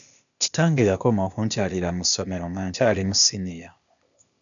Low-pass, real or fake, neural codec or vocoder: 7.2 kHz; fake; codec, 16 kHz, 0.8 kbps, ZipCodec